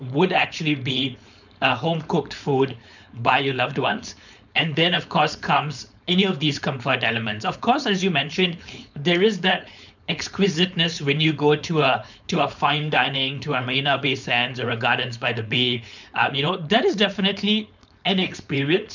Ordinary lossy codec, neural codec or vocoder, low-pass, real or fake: none; codec, 16 kHz, 4.8 kbps, FACodec; 7.2 kHz; fake